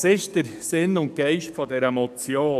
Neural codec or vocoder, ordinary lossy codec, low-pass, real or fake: codec, 44.1 kHz, 7.8 kbps, DAC; none; 14.4 kHz; fake